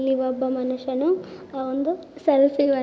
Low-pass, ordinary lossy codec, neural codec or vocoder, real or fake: none; none; none; real